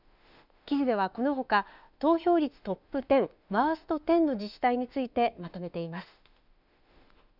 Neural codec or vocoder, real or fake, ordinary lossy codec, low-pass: autoencoder, 48 kHz, 32 numbers a frame, DAC-VAE, trained on Japanese speech; fake; none; 5.4 kHz